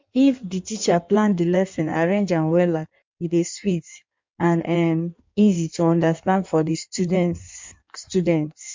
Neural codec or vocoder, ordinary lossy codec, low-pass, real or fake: codec, 16 kHz in and 24 kHz out, 1.1 kbps, FireRedTTS-2 codec; MP3, 64 kbps; 7.2 kHz; fake